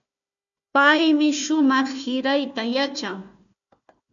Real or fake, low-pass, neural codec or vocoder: fake; 7.2 kHz; codec, 16 kHz, 1 kbps, FunCodec, trained on Chinese and English, 50 frames a second